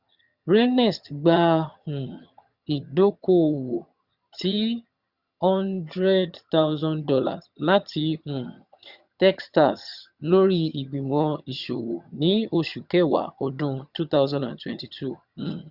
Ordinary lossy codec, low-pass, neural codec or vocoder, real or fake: Opus, 64 kbps; 5.4 kHz; vocoder, 22.05 kHz, 80 mel bands, HiFi-GAN; fake